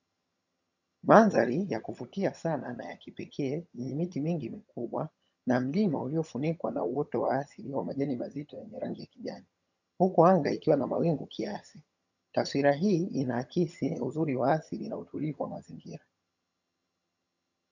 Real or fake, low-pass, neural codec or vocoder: fake; 7.2 kHz; vocoder, 22.05 kHz, 80 mel bands, HiFi-GAN